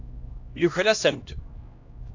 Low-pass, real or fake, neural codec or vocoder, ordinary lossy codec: 7.2 kHz; fake; codec, 16 kHz, 1 kbps, X-Codec, HuBERT features, trained on LibriSpeech; AAC, 48 kbps